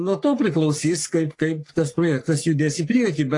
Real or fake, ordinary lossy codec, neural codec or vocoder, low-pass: fake; AAC, 48 kbps; codec, 44.1 kHz, 3.4 kbps, Pupu-Codec; 10.8 kHz